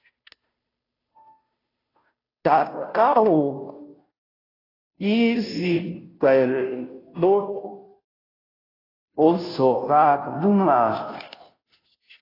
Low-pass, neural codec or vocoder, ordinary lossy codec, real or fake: 5.4 kHz; codec, 16 kHz, 0.5 kbps, FunCodec, trained on Chinese and English, 25 frames a second; AAC, 24 kbps; fake